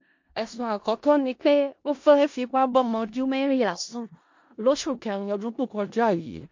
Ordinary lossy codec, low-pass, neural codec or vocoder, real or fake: MP3, 48 kbps; 7.2 kHz; codec, 16 kHz in and 24 kHz out, 0.4 kbps, LongCat-Audio-Codec, four codebook decoder; fake